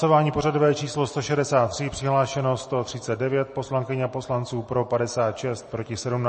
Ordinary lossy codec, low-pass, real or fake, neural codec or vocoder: MP3, 32 kbps; 10.8 kHz; real; none